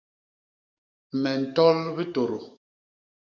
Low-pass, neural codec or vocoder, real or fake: 7.2 kHz; codec, 44.1 kHz, 7.8 kbps, DAC; fake